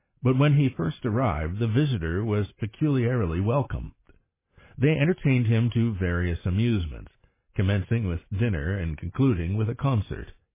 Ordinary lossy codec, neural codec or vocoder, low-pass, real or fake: MP3, 16 kbps; none; 3.6 kHz; real